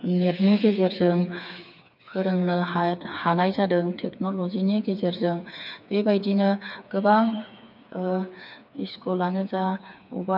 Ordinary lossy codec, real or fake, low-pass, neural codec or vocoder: none; fake; 5.4 kHz; codec, 16 kHz, 4 kbps, FreqCodec, smaller model